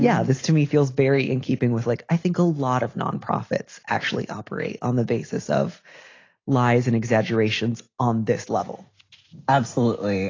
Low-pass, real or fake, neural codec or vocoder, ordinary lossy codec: 7.2 kHz; real; none; AAC, 32 kbps